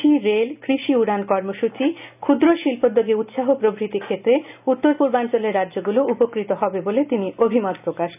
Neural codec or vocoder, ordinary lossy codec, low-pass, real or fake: none; none; 3.6 kHz; real